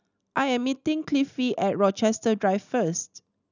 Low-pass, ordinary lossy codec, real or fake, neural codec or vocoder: 7.2 kHz; none; real; none